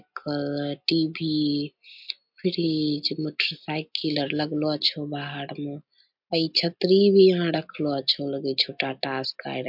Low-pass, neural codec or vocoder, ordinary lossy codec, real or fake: 5.4 kHz; none; MP3, 48 kbps; real